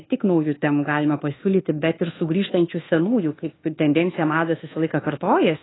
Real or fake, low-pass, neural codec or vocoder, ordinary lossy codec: fake; 7.2 kHz; codec, 24 kHz, 1.2 kbps, DualCodec; AAC, 16 kbps